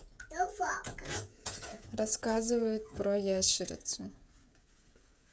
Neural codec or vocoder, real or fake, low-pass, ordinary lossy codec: codec, 16 kHz, 16 kbps, FreqCodec, smaller model; fake; none; none